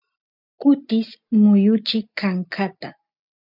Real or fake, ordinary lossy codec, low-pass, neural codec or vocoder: real; MP3, 48 kbps; 5.4 kHz; none